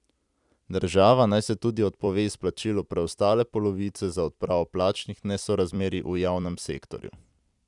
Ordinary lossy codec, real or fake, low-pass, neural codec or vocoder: none; fake; 10.8 kHz; vocoder, 44.1 kHz, 128 mel bands every 256 samples, BigVGAN v2